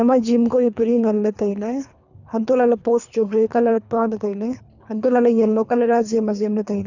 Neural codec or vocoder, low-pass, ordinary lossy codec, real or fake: codec, 24 kHz, 3 kbps, HILCodec; 7.2 kHz; none; fake